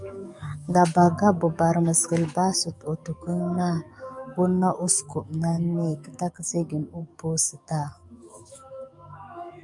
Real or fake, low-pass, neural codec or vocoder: fake; 10.8 kHz; codec, 44.1 kHz, 7.8 kbps, DAC